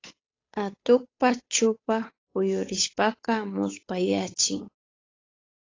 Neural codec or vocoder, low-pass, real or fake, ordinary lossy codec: codec, 16 kHz, 8 kbps, FunCodec, trained on Chinese and English, 25 frames a second; 7.2 kHz; fake; AAC, 32 kbps